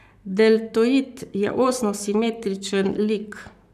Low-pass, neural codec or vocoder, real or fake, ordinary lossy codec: 14.4 kHz; codec, 44.1 kHz, 7.8 kbps, Pupu-Codec; fake; none